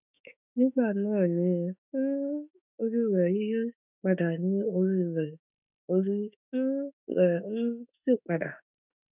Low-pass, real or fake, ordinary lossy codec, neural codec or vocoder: 3.6 kHz; fake; none; autoencoder, 48 kHz, 32 numbers a frame, DAC-VAE, trained on Japanese speech